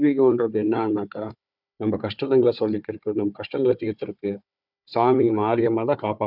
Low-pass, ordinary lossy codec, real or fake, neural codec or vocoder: 5.4 kHz; none; fake; codec, 16 kHz, 4 kbps, FunCodec, trained on Chinese and English, 50 frames a second